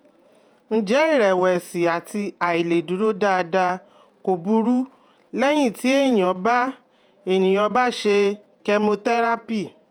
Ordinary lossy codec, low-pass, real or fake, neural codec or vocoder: none; 19.8 kHz; fake; vocoder, 48 kHz, 128 mel bands, Vocos